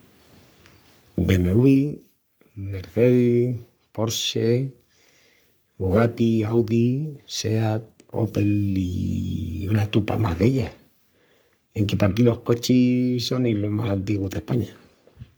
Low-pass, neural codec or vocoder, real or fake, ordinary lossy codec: none; codec, 44.1 kHz, 3.4 kbps, Pupu-Codec; fake; none